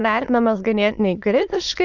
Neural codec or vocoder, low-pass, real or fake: autoencoder, 22.05 kHz, a latent of 192 numbers a frame, VITS, trained on many speakers; 7.2 kHz; fake